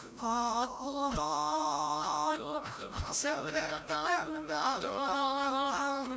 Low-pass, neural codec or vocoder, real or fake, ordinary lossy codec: none; codec, 16 kHz, 0.5 kbps, FreqCodec, larger model; fake; none